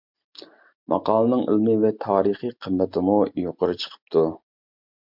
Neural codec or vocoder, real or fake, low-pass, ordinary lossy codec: none; real; 5.4 kHz; MP3, 48 kbps